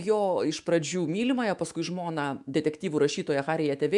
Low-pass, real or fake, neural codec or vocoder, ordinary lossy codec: 10.8 kHz; fake; autoencoder, 48 kHz, 128 numbers a frame, DAC-VAE, trained on Japanese speech; MP3, 96 kbps